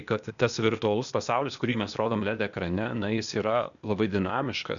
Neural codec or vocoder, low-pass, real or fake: codec, 16 kHz, 0.8 kbps, ZipCodec; 7.2 kHz; fake